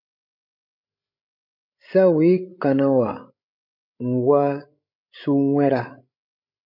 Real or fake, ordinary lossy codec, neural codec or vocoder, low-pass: fake; MP3, 32 kbps; codec, 16 kHz, 16 kbps, FreqCodec, larger model; 5.4 kHz